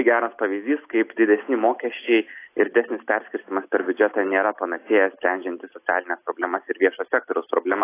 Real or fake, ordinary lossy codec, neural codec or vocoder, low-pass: real; AAC, 24 kbps; none; 3.6 kHz